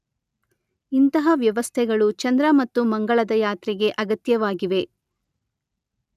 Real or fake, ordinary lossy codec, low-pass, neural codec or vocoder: real; none; 14.4 kHz; none